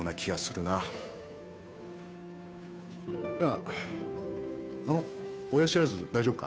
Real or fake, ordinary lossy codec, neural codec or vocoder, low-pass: fake; none; codec, 16 kHz, 2 kbps, FunCodec, trained on Chinese and English, 25 frames a second; none